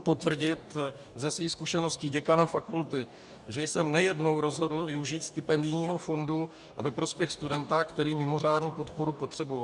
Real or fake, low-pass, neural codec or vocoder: fake; 10.8 kHz; codec, 44.1 kHz, 2.6 kbps, DAC